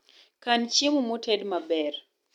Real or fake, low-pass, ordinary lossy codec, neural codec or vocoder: real; 19.8 kHz; none; none